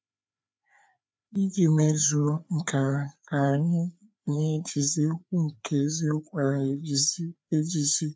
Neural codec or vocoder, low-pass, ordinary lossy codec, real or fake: codec, 16 kHz, 4 kbps, FreqCodec, larger model; none; none; fake